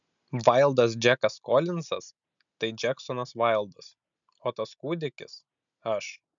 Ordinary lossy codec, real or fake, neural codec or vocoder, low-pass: MP3, 96 kbps; real; none; 7.2 kHz